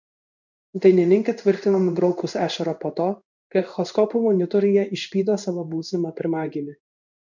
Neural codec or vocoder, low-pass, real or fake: codec, 16 kHz in and 24 kHz out, 1 kbps, XY-Tokenizer; 7.2 kHz; fake